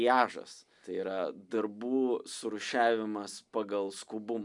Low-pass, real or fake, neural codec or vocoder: 10.8 kHz; real; none